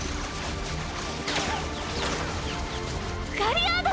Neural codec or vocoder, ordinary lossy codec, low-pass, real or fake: none; none; none; real